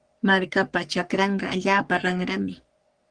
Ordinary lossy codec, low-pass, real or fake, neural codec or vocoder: Opus, 24 kbps; 9.9 kHz; fake; codec, 44.1 kHz, 3.4 kbps, Pupu-Codec